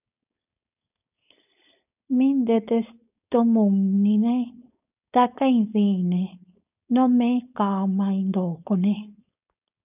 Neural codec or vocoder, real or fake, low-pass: codec, 16 kHz, 4.8 kbps, FACodec; fake; 3.6 kHz